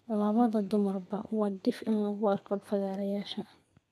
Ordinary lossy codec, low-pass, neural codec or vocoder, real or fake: none; 14.4 kHz; codec, 32 kHz, 1.9 kbps, SNAC; fake